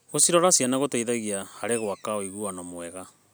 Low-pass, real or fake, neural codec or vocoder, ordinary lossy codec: none; real; none; none